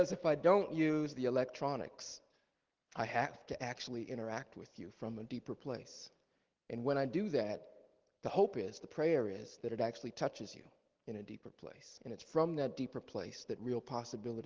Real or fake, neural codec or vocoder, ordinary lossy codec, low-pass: real; none; Opus, 16 kbps; 7.2 kHz